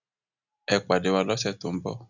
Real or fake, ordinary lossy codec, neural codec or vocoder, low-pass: real; none; none; 7.2 kHz